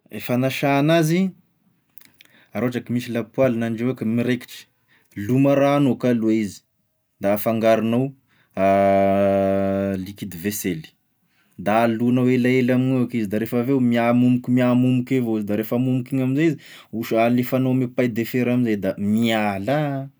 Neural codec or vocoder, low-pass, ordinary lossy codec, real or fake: none; none; none; real